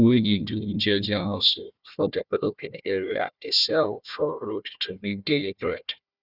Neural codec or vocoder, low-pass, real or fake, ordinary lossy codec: codec, 16 kHz, 1 kbps, FunCodec, trained on Chinese and English, 50 frames a second; 5.4 kHz; fake; Opus, 64 kbps